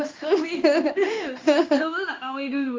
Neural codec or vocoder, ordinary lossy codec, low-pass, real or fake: codec, 16 kHz in and 24 kHz out, 1 kbps, XY-Tokenizer; Opus, 32 kbps; 7.2 kHz; fake